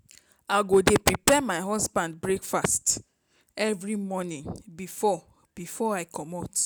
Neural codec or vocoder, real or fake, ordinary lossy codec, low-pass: none; real; none; none